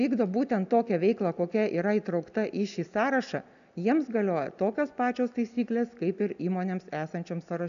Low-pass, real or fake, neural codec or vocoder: 7.2 kHz; real; none